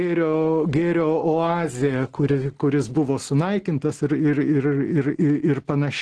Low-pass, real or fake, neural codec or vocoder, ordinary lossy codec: 10.8 kHz; real; none; Opus, 16 kbps